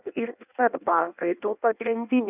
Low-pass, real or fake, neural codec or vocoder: 3.6 kHz; fake; codec, 16 kHz in and 24 kHz out, 0.6 kbps, FireRedTTS-2 codec